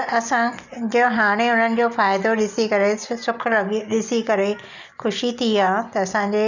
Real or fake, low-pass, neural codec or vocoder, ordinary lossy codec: real; 7.2 kHz; none; none